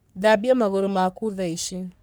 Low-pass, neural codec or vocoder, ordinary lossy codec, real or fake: none; codec, 44.1 kHz, 3.4 kbps, Pupu-Codec; none; fake